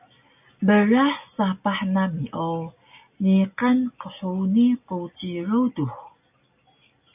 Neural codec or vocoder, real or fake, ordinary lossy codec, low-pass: none; real; AAC, 32 kbps; 3.6 kHz